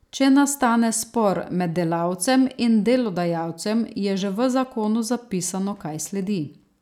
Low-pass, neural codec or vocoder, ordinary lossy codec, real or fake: 19.8 kHz; none; none; real